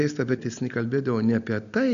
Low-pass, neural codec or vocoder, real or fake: 7.2 kHz; none; real